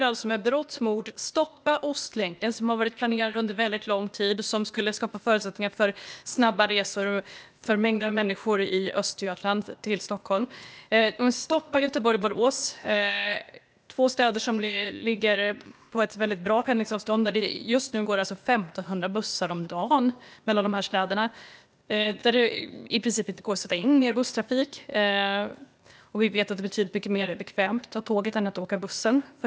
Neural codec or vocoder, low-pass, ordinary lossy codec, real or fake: codec, 16 kHz, 0.8 kbps, ZipCodec; none; none; fake